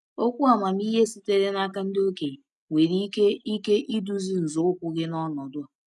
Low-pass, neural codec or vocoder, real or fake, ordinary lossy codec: none; none; real; none